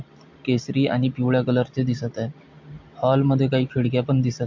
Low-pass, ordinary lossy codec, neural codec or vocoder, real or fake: 7.2 kHz; MP3, 48 kbps; none; real